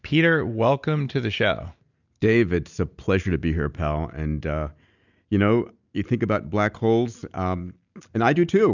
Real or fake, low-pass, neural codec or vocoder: real; 7.2 kHz; none